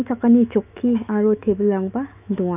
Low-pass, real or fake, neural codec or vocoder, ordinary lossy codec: 3.6 kHz; fake; autoencoder, 48 kHz, 128 numbers a frame, DAC-VAE, trained on Japanese speech; none